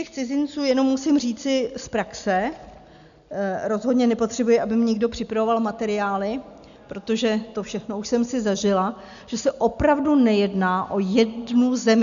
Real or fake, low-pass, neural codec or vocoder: real; 7.2 kHz; none